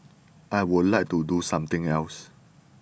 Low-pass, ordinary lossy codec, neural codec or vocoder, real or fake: none; none; none; real